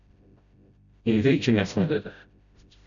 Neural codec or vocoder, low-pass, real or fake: codec, 16 kHz, 0.5 kbps, FreqCodec, smaller model; 7.2 kHz; fake